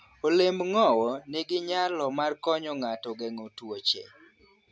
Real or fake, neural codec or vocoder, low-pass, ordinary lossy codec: real; none; none; none